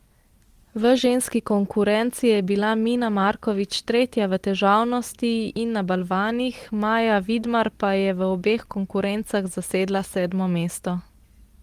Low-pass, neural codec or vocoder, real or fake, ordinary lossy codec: 14.4 kHz; none; real; Opus, 24 kbps